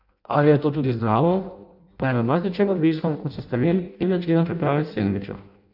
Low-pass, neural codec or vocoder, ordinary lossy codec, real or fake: 5.4 kHz; codec, 16 kHz in and 24 kHz out, 0.6 kbps, FireRedTTS-2 codec; none; fake